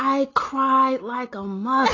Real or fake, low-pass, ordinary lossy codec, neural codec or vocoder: real; 7.2 kHz; AAC, 32 kbps; none